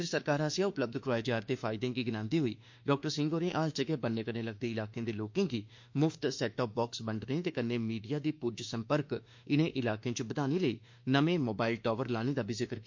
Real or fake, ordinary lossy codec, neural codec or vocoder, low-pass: fake; MP3, 48 kbps; autoencoder, 48 kHz, 32 numbers a frame, DAC-VAE, trained on Japanese speech; 7.2 kHz